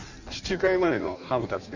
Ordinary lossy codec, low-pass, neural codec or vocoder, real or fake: none; 7.2 kHz; codec, 16 kHz in and 24 kHz out, 1.1 kbps, FireRedTTS-2 codec; fake